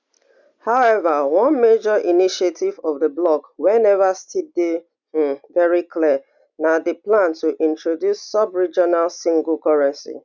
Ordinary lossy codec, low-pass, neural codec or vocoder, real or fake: none; 7.2 kHz; none; real